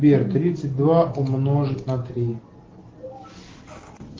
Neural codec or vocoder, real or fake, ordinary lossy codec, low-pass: none; real; Opus, 16 kbps; 7.2 kHz